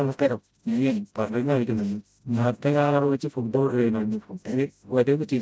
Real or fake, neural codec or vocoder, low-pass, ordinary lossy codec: fake; codec, 16 kHz, 0.5 kbps, FreqCodec, smaller model; none; none